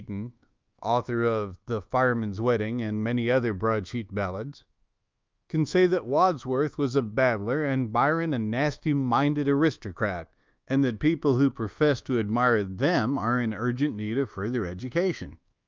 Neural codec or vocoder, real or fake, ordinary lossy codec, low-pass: codec, 24 kHz, 1.2 kbps, DualCodec; fake; Opus, 24 kbps; 7.2 kHz